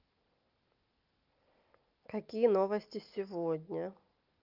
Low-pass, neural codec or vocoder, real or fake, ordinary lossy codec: 5.4 kHz; none; real; Opus, 24 kbps